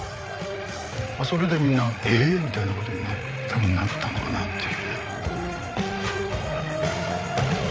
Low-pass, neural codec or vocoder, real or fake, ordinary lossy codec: none; codec, 16 kHz, 8 kbps, FreqCodec, larger model; fake; none